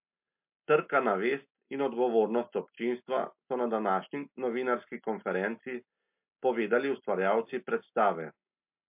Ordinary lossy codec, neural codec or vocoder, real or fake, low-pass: MP3, 32 kbps; none; real; 3.6 kHz